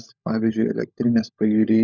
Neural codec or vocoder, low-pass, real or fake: codec, 16 kHz, 16 kbps, FunCodec, trained on LibriTTS, 50 frames a second; 7.2 kHz; fake